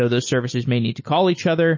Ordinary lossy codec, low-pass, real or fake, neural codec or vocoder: MP3, 32 kbps; 7.2 kHz; real; none